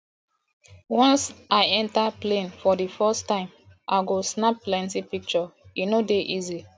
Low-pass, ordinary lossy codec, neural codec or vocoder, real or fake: none; none; none; real